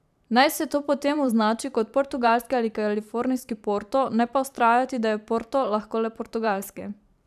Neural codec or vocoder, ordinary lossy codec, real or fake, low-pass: vocoder, 44.1 kHz, 128 mel bands every 512 samples, BigVGAN v2; none; fake; 14.4 kHz